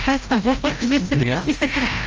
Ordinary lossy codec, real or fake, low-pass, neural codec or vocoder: Opus, 24 kbps; fake; 7.2 kHz; codec, 16 kHz, 0.5 kbps, FreqCodec, larger model